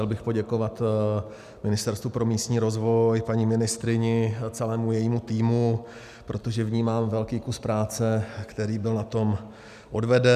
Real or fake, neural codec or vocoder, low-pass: real; none; 14.4 kHz